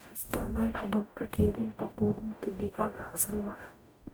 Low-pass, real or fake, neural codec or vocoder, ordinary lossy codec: none; fake; codec, 44.1 kHz, 0.9 kbps, DAC; none